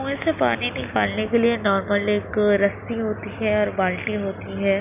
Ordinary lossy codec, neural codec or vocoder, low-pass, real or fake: none; none; 3.6 kHz; real